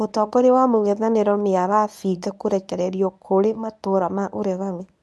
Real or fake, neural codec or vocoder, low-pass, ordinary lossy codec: fake; codec, 24 kHz, 0.9 kbps, WavTokenizer, medium speech release version 1; none; none